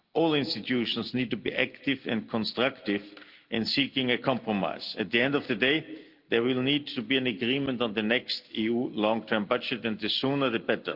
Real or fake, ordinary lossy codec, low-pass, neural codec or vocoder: real; Opus, 24 kbps; 5.4 kHz; none